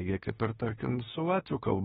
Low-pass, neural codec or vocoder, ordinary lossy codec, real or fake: 19.8 kHz; autoencoder, 48 kHz, 32 numbers a frame, DAC-VAE, trained on Japanese speech; AAC, 16 kbps; fake